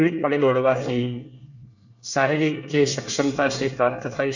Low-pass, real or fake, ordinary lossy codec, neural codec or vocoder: 7.2 kHz; fake; none; codec, 24 kHz, 1 kbps, SNAC